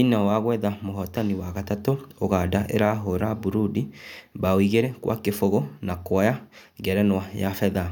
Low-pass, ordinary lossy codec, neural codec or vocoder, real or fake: 19.8 kHz; none; none; real